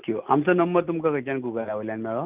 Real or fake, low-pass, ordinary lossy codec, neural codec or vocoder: real; 3.6 kHz; Opus, 32 kbps; none